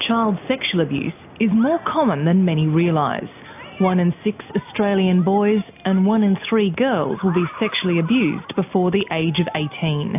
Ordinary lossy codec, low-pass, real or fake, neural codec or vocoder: AAC, 32 kbps; 3.6 kHz; real; none